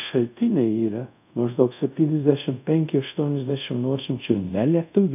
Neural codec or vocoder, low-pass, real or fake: codec, 24 kHz, 0.5 kbps, DualCodec; 3.6 kHz; fake